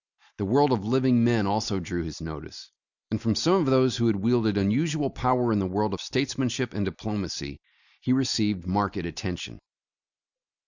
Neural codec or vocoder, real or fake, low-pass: none; real; 7.2 kHz